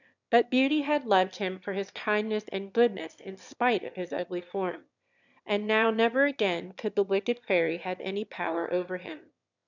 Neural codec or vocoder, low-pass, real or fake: autoencoder, 22.05 kHz, a latent of 192 numbers a frame, VITS, trained on one speaker; 7.2 kHz; fake